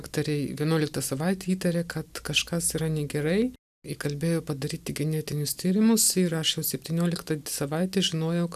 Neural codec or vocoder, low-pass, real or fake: none; 14.4 kHz; real